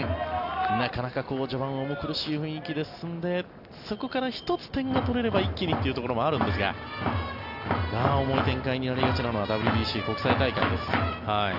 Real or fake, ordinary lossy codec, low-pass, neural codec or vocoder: real; Opus, 64 kbps; 5.4 kHz; none